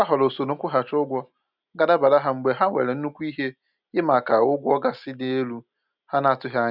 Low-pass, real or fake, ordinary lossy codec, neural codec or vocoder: 5.4 kHz; real; none; none